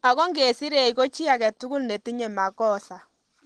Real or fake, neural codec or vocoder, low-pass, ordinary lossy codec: real; none; 10.8 kHz; Opus, 16 kbps